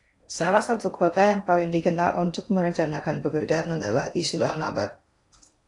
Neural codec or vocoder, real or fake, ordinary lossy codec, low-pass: codec, 16 kHz in and 24 kHz out, 0.6 kbps, FocalCodec, streaming, 4096 codes; fake; AAC, 48 kbps; 10.8 kHz